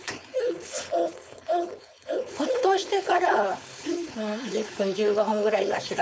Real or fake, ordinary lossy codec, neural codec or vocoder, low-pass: fake; none; codec, 16 kHz, 4.8 kbps, FACodec; none